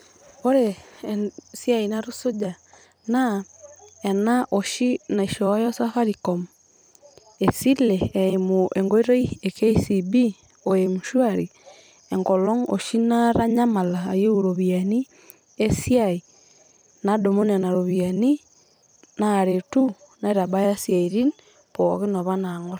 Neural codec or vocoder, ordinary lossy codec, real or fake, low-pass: vocoder, 44.1 kHz, 128 mel bands every 256 samples, BigVGAN v2; none; fake; none